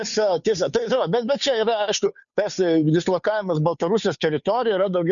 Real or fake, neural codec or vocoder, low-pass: fake; codec, 16 kHz, 2 kbps, FunCodec, trained on Chinese and English, 25 frames a second; 7.2 kHz